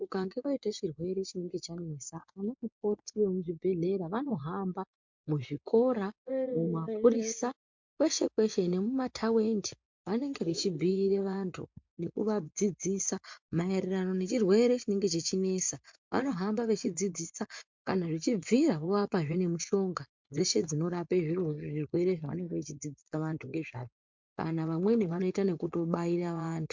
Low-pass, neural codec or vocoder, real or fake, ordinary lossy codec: 7.2 kHz; vocoder, 24 kHz, 100 mel bands, Vocos; fake; MP3, 64 kbps